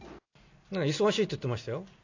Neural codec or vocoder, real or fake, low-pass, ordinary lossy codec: none; real; 7.2 kHz; AAC, 48 kbps